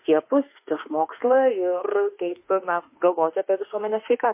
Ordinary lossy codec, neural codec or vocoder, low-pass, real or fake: MP3, 24 kbps; autoencoder, 48 kHz, 32 numbers a frame, DAC-VAE, trained on Japanese speech; 3.6 kHz; fake